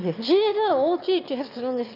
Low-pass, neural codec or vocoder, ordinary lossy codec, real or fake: 5.4 kHz; autoencoder, 22.05 kHz, a latent of 192 numbers a frame, VITS, trained on one speaker; none; fake